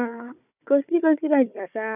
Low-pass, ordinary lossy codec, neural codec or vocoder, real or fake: 3.6 kHz; none; codec, 16 kHz, 4 kbps, FunCodec, trained on Chinese and English, 50 frames a second; fake